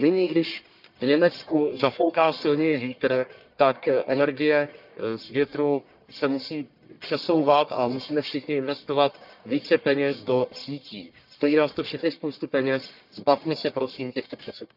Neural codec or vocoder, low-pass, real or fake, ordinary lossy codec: codec, 44.1 kHz, 1.7 kbps, Pupu-Codec; 5.4 kHz; fake; none